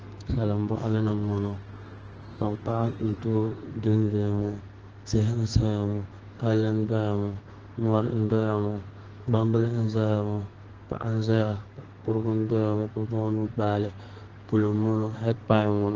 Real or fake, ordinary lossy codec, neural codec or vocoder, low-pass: fake; Opus, 16 kbps; codec, 32 kHz, 1.9 kbps, SNAC; 7.2 kHz